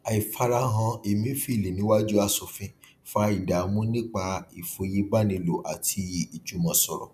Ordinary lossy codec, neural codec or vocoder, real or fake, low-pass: none; none; real; 14.4 kHz